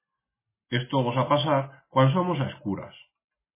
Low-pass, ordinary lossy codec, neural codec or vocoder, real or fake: 3.6 kHz; MP3, 16 kbps; none; real